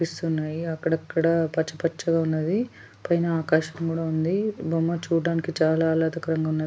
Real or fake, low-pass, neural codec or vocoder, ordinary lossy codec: real; none; none; none